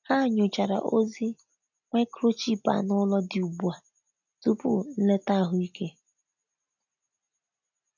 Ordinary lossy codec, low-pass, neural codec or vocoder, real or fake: none; 7.2 kHz; none; real